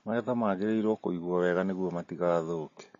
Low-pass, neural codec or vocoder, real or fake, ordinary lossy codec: 7.2 kHz; none; real; MP3, 32 kbps